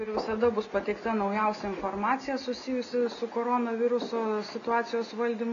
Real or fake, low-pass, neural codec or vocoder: real; 7.2 kHz; none